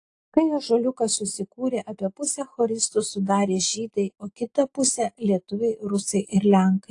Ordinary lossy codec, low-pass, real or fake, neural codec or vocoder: AAC, 48 kbps; 10.8 kHz; real; none